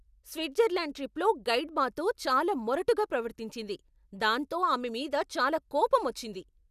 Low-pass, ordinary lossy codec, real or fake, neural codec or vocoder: 14.4 kHz; none; real; none